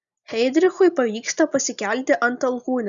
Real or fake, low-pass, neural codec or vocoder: real; 7.2 kHz; none